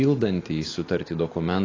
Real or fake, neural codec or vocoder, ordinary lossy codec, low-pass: real; none; AAC, 32 kbps; 7.2 kHz